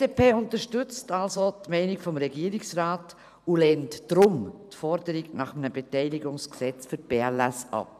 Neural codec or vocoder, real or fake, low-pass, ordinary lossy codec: vocoder, 44.1 kHz, 128 mel bands every 512 samples, BigVGAN v2; fake; 14.4 kHz; none